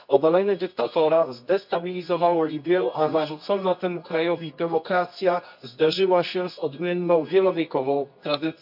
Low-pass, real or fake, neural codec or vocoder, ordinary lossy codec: 5.4 kHz; fake; codec, 24 kHz, 0.9 kbps, WavTokenizer, medium music audio release; AAC, 48 kbps